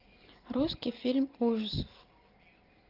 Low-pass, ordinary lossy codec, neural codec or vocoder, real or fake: 5.4 kHz; Opus, 24 kbps; none; real